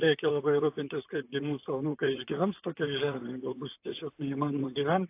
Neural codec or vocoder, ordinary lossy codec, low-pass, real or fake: vocoder, 44.1 kHz, 128 mel bands, Pupu-Vocoder; AAC, 24 kbps; 3.6 kHz; fake